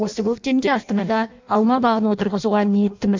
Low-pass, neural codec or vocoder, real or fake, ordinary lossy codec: 7.2 kHz; codec, 16 kHz in and 24 kHz out, 0.6 kbps, FireRedTTS-2 codec; fake; MP3, 64 kbps